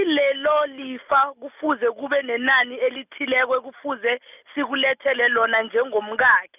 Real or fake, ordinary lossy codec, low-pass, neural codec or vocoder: real; none; 3.6 kHz; none